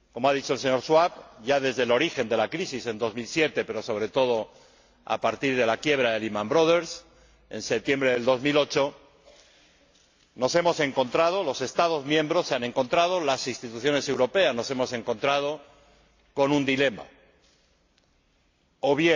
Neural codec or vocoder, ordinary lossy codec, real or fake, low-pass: none; AAC, 48 kbps; real; 7.2 kHz